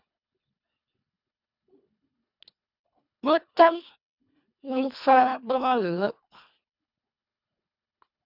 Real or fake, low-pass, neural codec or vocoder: fake; 5.4 kHz; codec, 24 kHz, 1.5 kbps, HILCodec